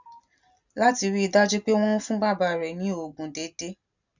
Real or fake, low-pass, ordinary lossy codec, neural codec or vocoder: real; 7.2 kHz; none; none